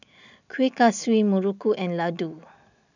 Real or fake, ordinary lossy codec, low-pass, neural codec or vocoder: real; none; 7.2 kHz; none